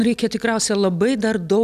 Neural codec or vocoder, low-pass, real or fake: none; 14.4 kHz; real